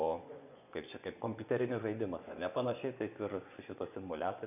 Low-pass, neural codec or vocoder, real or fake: 3.6 kHz; vocoder, 24 kHz, 100 mel bands, Vocos; fake